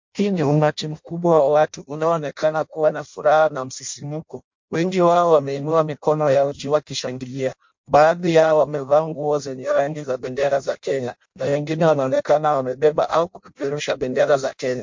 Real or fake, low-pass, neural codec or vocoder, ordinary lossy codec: fake; 7.2 kHz; codec, 16 kHz in and 24 kHz out, 0.6 kbps, FireRedTTS-2 codec; MP3, 48 kbps